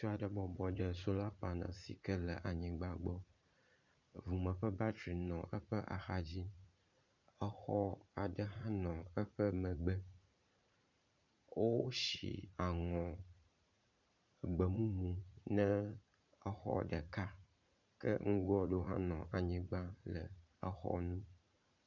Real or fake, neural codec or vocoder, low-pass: fake; vocoder, 44.1 kHz, 80 mel bands, Vocos; 7.2 kHz